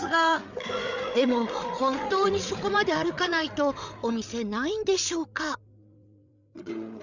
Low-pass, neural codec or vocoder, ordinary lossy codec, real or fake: 7.2 kHz; codec, 16 kHz, 8 kbps, FreqCodec, larger model; none; fake